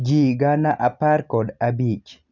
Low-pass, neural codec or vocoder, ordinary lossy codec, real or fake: 7.2 kHz; none; none; real